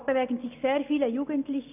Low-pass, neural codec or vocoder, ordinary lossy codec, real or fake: 3.6 kHz; none; none; real